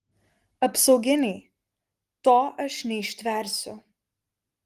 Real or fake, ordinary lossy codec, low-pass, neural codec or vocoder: real; Opus, 24 kbps; 14.4 kHz; none